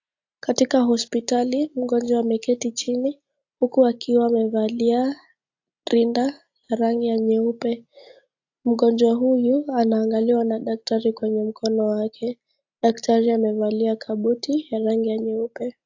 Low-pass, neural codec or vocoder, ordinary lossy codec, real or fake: 7.2 kHz; none; AAC, 48 kbps; real